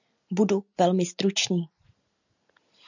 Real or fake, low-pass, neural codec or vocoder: real; 7.2 kHz; none